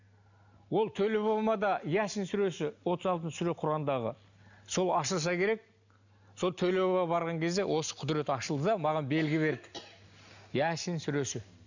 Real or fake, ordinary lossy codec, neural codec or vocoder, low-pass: real; none; none; 7.2 kHz